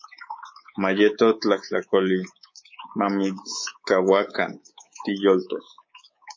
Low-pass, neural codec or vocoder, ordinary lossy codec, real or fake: 7.2 kHz; codec, 24 kHz, 3.1 kbps, DualCodec; MP3, 32 kbps; fake